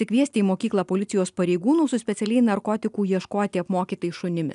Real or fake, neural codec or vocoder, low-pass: real; none; 10.8 kHz